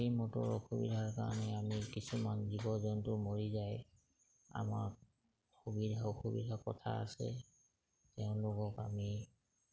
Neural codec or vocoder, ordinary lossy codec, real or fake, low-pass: none; none; real; none